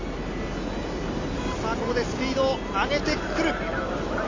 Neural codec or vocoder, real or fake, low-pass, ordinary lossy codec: none; real; 7.2 kHz; AAC, 32 kbps